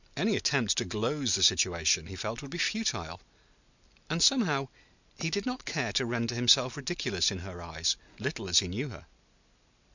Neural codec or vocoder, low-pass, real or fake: none; 7.2 kHz; real